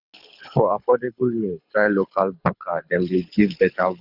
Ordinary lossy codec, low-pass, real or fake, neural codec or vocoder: none; 5.4 kHz; fake; codec, 24 kHz, 6 kbps, HILCodec